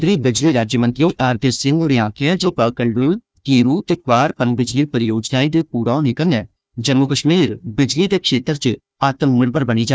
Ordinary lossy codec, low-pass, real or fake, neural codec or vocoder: none; none; fake; codec, 16 kHz, 1 kbps, FunCodec, trained on Chinese and English, 50 frames a second